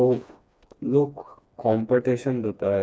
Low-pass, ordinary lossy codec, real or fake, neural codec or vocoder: none; none; fake; codec, 16 kHz, 2 kbps, FreqCodec, smaller model